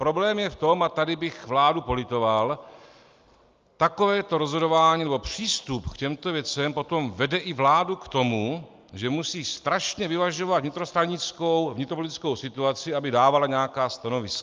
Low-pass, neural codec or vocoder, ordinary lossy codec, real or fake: 7.2 kHz; none; Opus, 24 kbps; real